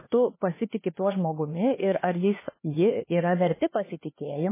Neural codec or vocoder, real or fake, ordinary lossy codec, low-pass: codec, 16 kHz, 2 kbps, X-Codec, HuBERT features, trained on LibriSpeech; fake; MP3, 16 kbps; 3.6 kHz